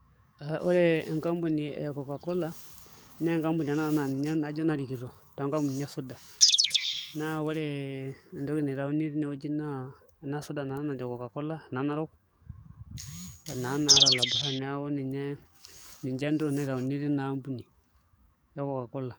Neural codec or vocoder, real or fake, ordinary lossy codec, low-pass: codec, 44.1 kHz, 7.8 kbps, DAC; fake; none; none